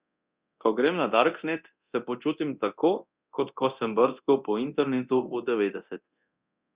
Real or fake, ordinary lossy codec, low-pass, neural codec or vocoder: fake; Opus, 64 kbps; 3.6 kHz; codec, 24 kHz, 0.9 kbps, DualCodec